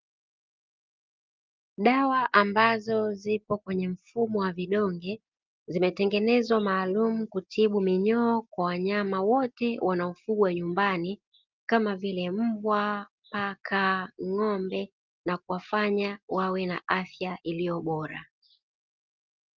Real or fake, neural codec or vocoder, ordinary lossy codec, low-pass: real; none; Opus, 32 kbps; 7.2 kHz